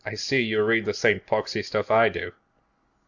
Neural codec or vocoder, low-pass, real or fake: none; 7.2 kHz; real